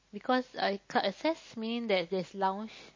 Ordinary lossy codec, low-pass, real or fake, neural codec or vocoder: MP3, 32 kbps; 7.2 kHz; real; none